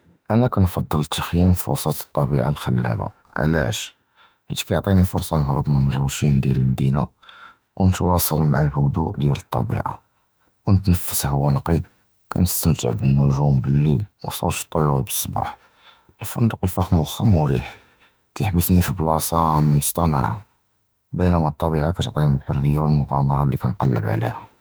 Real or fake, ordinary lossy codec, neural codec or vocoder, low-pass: fake; none; autoencoder, 48 kHz, 32 numbers a frame, DAC-VAE, trained on Japanese speech; none